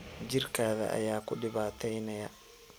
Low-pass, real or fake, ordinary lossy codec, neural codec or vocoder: none; real; none; none